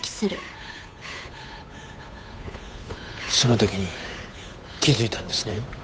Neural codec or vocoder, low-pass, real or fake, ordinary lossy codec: codec, 16 kHz, 8 kbps, FunCodec, trained on Chinese and English, 25 frames a second; none; fake; none